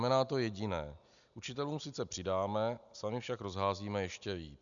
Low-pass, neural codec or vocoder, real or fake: 7.2 kHz; none; real